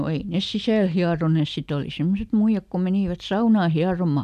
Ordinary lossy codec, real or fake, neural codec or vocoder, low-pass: none; real; none; 14.4 kHz